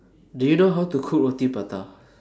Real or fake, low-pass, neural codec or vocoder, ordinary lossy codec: real; none; none; none